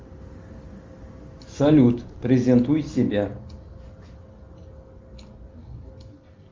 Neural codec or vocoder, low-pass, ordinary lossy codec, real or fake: none; 7.2 kHz; Opus, 32 kbps; real